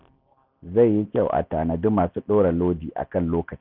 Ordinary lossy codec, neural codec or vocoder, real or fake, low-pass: none; none; real; 5.4 kHz